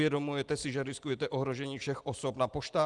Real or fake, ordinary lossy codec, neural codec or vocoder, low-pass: real; Opus, 24 kbps; none; 10.8 kHz